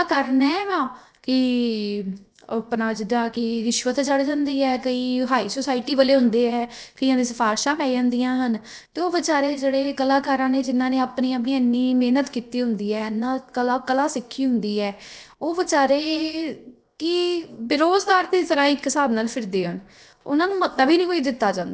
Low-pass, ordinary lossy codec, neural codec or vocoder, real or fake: none; none; codec, 16 kHz, 0.7 kbps, FocalCodec; fake